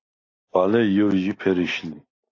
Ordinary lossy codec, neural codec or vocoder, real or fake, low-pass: AAC, 32 kbps; none; real; 7.2 kHz